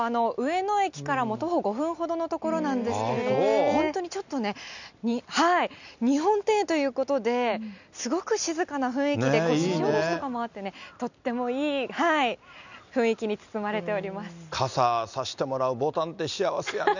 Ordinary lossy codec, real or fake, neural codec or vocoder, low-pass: none; real; none; 7.2 kHz